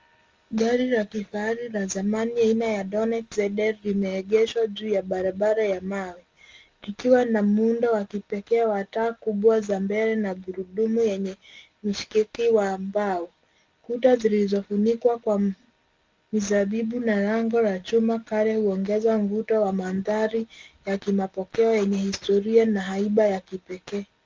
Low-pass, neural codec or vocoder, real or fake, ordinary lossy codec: 7.2 kHz; none; real; Opus, 32 kbps